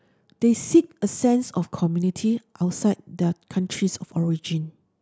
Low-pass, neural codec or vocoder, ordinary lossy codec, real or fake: none; none; none; real